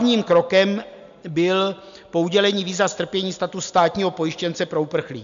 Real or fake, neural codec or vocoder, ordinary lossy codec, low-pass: real; none; MP3, 64 kbps; 7.2 kHz